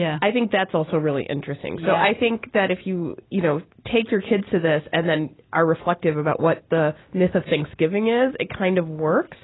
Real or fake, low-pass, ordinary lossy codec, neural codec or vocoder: real; 7.2 kHz; AAC, 16 kbps; none